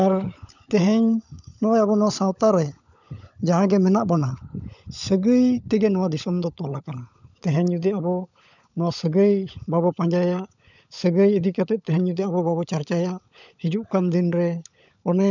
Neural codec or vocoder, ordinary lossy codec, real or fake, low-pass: codec, 16 kHz, 16 kbps, FunCodec, trained on LibriTTS, 50 frames a second; none; fake; 7.2 kHz